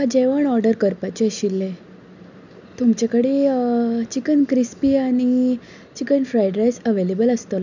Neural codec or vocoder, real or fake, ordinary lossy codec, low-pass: none; real; none; 7.2 kHz